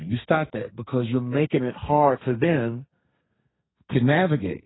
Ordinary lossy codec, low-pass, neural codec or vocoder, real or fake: AAC, 16 kbps; 7.2 kHz; codec, 32 kHz, 1.9 kbps, SNAC; fake